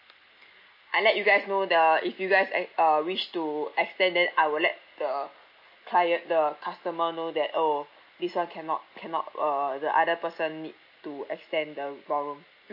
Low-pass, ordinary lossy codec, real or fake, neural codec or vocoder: 5.4 kHz; MP3, 32 kbps; real; none